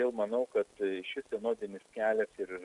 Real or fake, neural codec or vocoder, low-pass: real; none; 10.8 kHz